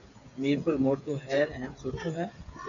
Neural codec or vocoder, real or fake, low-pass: codec, 16 kHz, 8 kbps, FreqCodec, smaller model; fake; 7.2 kHz